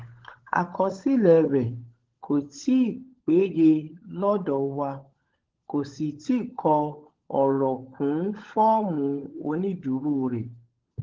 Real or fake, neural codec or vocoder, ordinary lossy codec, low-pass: fake; codec, 16 kHz, 16 kbps, FunCodec, trained on LibriTTS, 50 frames a second; Opus, 16 kbps; 7.2 kHz